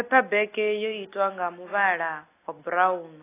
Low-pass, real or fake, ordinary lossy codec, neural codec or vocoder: 3.6 kHz; real; AAC, 24 kbps; none